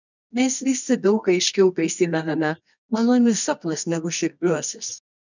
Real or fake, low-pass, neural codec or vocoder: fake; 7.2 kHz; codec, 24 kHz, 0.9 kbps, WavTokenizer, medium music audio release